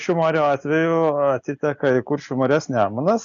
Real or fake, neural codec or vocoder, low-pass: real; none; 7.2 kHz